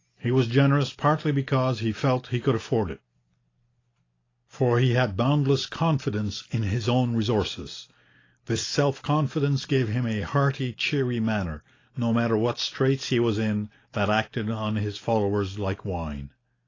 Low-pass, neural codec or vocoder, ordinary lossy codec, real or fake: 7.2 kHz; none; AAC, 32 kbps; real